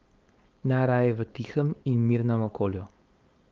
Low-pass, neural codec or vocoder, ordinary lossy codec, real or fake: 7.2 kHz; codec, 16 kHz, 4.8 kbps, FACodec; Opus, 32 kbps; fake